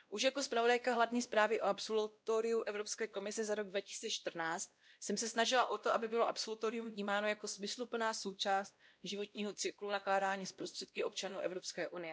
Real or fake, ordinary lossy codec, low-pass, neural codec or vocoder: fake; none; none; codec, 16 kHz, 1 kbps, X-Codec, WavLM features, trained on Multilingual LibriSpeech